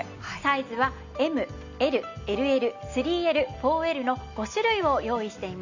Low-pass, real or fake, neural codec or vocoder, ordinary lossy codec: 7.2 kHz; real; none; none